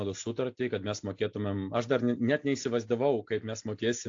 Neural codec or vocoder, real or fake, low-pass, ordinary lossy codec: none; real; 7.2 kHz; AAC, 48 kbps